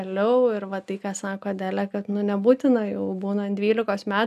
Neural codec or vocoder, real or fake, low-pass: none; real; 14.4 kHz